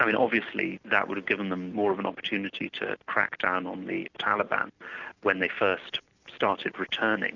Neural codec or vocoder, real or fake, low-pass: none; real; 7.2 kHz